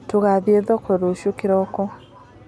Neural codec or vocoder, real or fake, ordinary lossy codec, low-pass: none; real; none; none